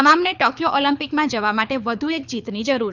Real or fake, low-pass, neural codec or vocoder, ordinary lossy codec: fake; 7.2 kHz; codec, 24 kHz, 6 kbps, HILCodec; none